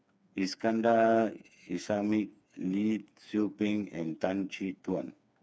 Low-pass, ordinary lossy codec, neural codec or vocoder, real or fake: none; none; codec, 16 kHz, 4 kbps, FreqCodec, smaller model; fake